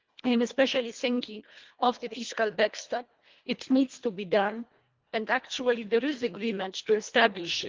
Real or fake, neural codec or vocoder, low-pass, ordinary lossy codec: fake; codec, 24 kHz, 1.5 kbps, HILCodec; 7.2 kHz; Opus, 32 kbps